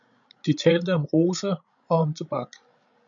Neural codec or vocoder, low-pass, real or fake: codec, 16 kHz, 16 kbps, FreqCodec, larger model; 7.2 kHz; fake